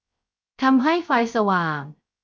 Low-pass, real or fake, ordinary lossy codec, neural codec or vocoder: none; fake; none; codec, 16 kHz, 0.3 kbps, FocalCodec